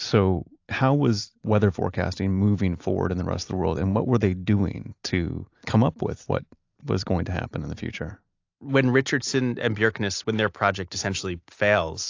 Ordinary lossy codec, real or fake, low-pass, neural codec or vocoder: AAC, 48 kbps; real; 7.2 kHz; none